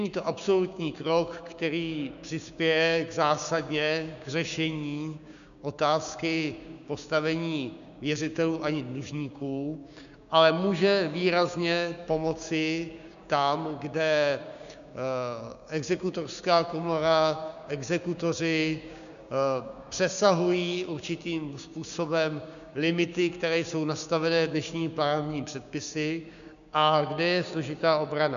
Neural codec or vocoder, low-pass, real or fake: codec, 16 kHz, 6 kbps, DAC; 7.2 kHz; fake